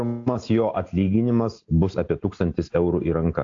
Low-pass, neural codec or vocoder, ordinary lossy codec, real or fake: 7.2 kHz; none; AAC, 48 kbps; real